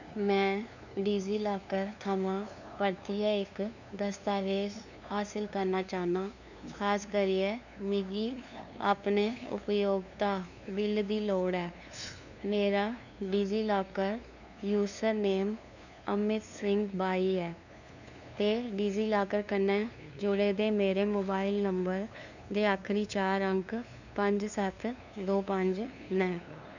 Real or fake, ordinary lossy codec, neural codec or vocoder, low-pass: fake; none; codec, 16 kHz, 2 kbps, FunCodec, trained on LibriTTS, 25 frames a second; 7.2 kHz